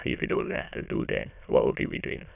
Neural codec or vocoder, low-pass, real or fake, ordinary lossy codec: autoencoder, 22.05 kHz, a latent of 192 numbers a frame, VITS, trained on many speakers; 3.6 kHz; fake; none